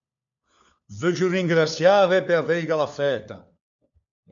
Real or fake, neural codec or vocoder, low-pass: fake; codec, 16 kHz, 4 kbps, FunCodec, trained on LibriTTS, 50 frames a second; 7.2 kHz